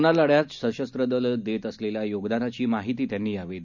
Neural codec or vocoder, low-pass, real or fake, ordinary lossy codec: none; 7.2 kHz; real; none